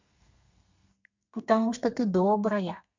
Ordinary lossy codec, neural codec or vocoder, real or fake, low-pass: MP3, 64 kbps; codec, 32 kHz, 1.9 kbps, SNAC; fake; 7.2 kHz